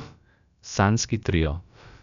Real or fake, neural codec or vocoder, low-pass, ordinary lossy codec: fake; codec, 16 kHz, about 1 kbps, DyCAST, with the encoder's durations; 7.2 kHz; none